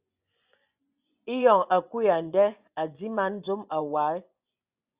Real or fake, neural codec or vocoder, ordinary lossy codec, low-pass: fake; vocoder, 24 kHz, 100 mel bands, Vocos; Opus, 64 kbps; 3.6 kHz